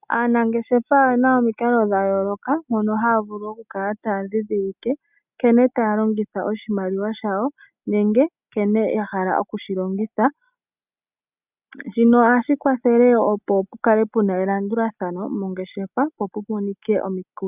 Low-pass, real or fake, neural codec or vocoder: 3.6 kHz; real; none